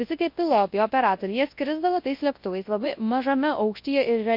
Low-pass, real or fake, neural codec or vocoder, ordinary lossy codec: 5.4 kHz; fake; codec, 24 kHz, 0.9 kbps, WavTokenizer, large speech release; MP3, 32 kbps